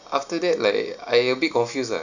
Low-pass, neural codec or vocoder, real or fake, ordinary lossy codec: 7.2 kHz; none; real; none